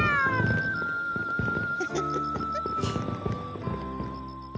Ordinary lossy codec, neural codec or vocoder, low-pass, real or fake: none; none; none; real